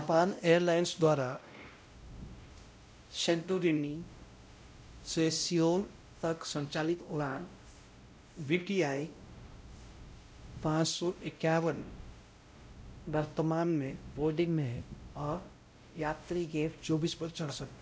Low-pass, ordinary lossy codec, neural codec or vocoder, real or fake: none; none; codec, 16 kHz, 0.5 kbps, X-Codec, WavLM features, trained on Multilingual LibriSpeech; fake